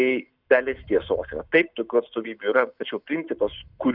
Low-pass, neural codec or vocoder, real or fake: 5.4 kHz; none; real